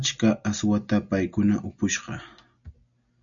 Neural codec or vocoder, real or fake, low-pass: none; real; 7.2 kHz